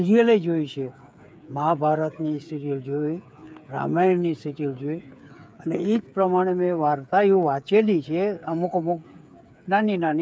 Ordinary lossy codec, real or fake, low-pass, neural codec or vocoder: none; fake; none; codec, 16 kHz, 8 kbps, FreqCodec, smaller model